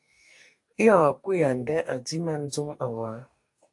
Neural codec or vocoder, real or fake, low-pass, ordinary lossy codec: codec, 44.1 kHz, 2.6 kbps, DAC; fake; 10.8 kHz; AAC, 64 kbps